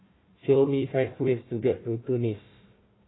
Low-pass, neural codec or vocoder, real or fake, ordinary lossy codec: 7.2 kHz; codec, 16 kHz, 1 kbps, FunCodec, trained on Chinese and English, 50 frames a second; fake; AAC, 16 kbps